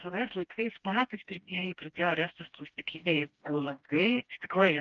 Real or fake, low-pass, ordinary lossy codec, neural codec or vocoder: fake; 7.2 kHz; Opus, 24 kbps; codec, 16 kHz, 1 kbps, FreqCodec, smaller model